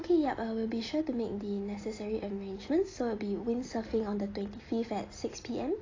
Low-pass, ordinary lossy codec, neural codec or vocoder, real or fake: 7.2 kHz; AAC, 32 kbps; none; real